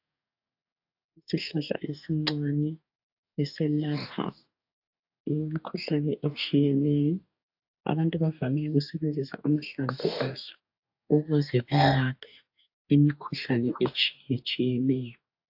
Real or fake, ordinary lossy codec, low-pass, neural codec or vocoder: fake; AAC, 48 kbps; 5.4 kHz; codec, 44.1 kHz, 2.6 kbps, DAC